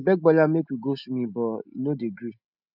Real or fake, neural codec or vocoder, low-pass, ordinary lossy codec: real; none; 5.4 kHz; none